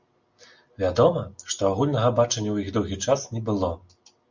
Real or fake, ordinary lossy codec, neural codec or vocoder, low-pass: real; Opus, 64 kbps; none; 7.2 kHz